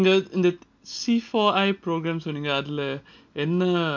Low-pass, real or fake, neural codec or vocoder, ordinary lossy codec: 7.2 kHz; real; none; MP3, 48 kbps